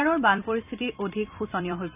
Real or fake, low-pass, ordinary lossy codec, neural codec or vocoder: fake; 3.6 kHz; none; vocoder, 44.1 kHz, 128 mel bands every 256 samples, BigVGAN v2